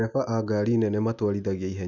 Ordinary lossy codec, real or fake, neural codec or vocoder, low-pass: none; real; none; 7.2 kHz